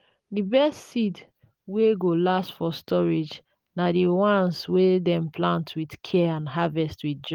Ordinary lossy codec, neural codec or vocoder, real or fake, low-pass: Opus, 32 kbps; none; real; 19.8 kHz